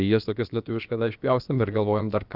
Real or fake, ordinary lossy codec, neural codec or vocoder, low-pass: fake; Opus, 24 kbps; codec, 16 kHz, about 1 kbps, DyCAST, with the encoder's durations; 5.4 kHz